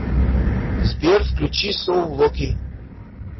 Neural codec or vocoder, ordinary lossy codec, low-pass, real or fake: none; MP3, 24 kbps; 7.2 kHz; real